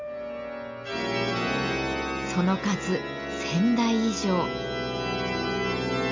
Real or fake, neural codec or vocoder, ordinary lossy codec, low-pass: real; none; MP3, 64 kbps; 7.2 kHz